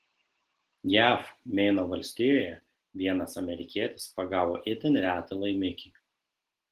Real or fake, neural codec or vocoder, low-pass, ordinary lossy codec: real; none; 14.4 kHz; Opus, 16 kbps